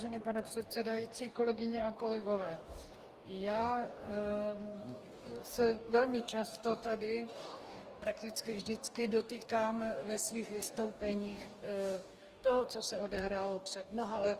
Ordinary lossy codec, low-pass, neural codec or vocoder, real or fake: Opus, 24 kbps; 14.4 kHz; codec, 44.1 kHz, 2.6 kbps, DAC; fake